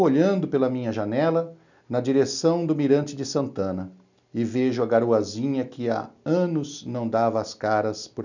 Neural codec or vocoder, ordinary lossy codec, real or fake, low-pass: none; none; real; 7.2 kHz